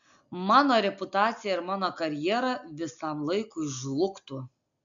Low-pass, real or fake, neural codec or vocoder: 7.2 kHz; real; none